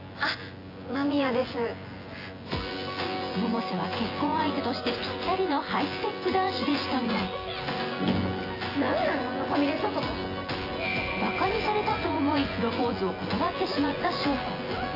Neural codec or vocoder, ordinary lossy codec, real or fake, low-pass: vocoder, 24 kHz, 100 mel bands, Vocos; AAC, 24 kbps; fake; 5.4 kHz